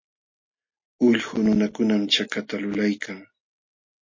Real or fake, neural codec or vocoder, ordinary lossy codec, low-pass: real; none; MP3, 32 kbps; 7.2 kHz